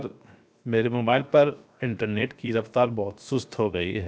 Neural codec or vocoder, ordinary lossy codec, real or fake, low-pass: codec, 16 kHz, 0.7 kbps, FocalCodec; none; fake; none